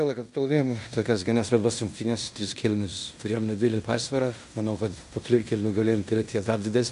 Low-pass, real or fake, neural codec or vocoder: 10.8 kHz; fake; codec, 16 kHz in and 24 kHz out, 0.9 kbps, LongCat-Audio-Codec, fine tuned four codebook decoder